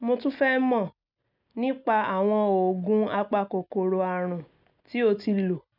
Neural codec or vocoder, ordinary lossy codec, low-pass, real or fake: none; none; 5.4 kHz; real